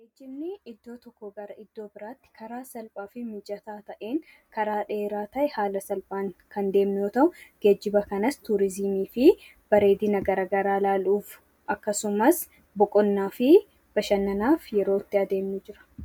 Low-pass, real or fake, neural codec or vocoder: 19.8 kHz; real; none